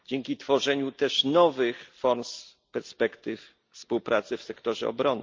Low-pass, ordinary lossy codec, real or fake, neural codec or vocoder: 7.2 kHz; Opus, 24 kbps; real; none